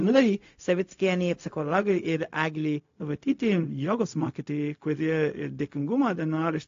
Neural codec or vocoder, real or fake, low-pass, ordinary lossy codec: codec, 16 kHz, 0.4 kbps, LongCat-Audio-Codec; fake; 7.2 kHz; AAC, 48 kbps